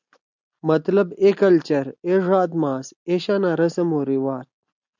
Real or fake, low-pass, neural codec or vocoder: real; 7.2 kHz; none